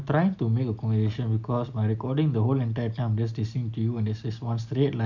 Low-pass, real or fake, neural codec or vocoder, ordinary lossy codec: 7.2 kHz; real; none; none